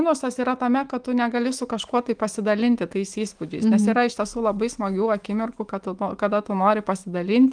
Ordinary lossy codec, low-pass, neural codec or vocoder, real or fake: Opus, 24 kbps; 9.9 kHz; autoencoder, 48 kHz, 128 numbers a frame, DAC-VAE, trained on Japanese speech; fake